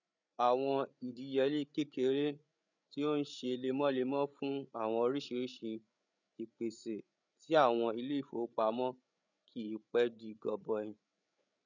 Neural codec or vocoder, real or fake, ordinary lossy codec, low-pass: codec, 16 kHz, 8 kbps, FreqCodec, larger model; fake; none; 7.2 kHz